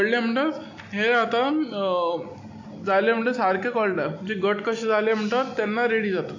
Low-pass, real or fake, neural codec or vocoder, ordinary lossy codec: 7.2 kHz; real; none; AAC, 48 kbps